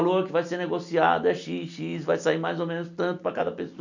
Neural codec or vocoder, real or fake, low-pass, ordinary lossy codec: none; real; 7.2 kHz; none